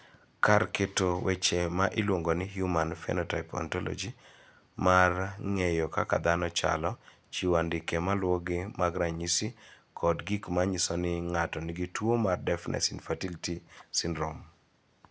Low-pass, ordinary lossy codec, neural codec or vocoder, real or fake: none; none; none; real